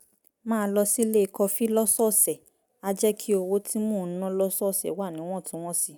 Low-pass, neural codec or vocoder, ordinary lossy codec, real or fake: none; none; none; real